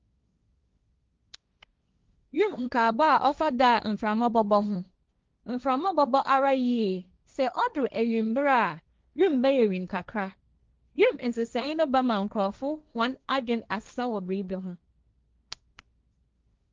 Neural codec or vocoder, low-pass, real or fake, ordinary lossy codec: codec, 16 kHz, 1.1 kbps, Voila-Tokenizer; 7.2 kHz; fake; Opus, 24 kbps